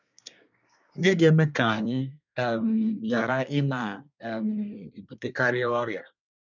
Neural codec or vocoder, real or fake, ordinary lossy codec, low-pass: codec, 24 kHz, 1 kbps, SNAC; fake; none; 7.2 kHz